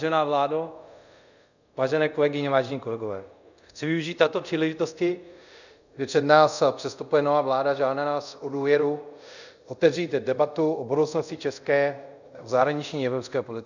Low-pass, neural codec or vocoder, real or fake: 7.2 kHz; codec, 24 kHz, 0.5 kbps, DualCodec; fake